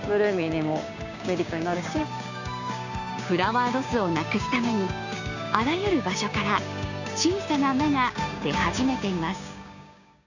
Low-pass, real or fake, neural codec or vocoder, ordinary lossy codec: 7.2 kHz; fake; codec, 16 kHz, 6 kbps, DAC; none